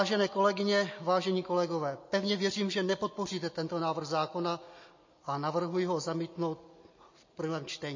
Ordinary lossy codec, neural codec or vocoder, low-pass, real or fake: MP3, 32 kbps; none; 7.2 kHz; real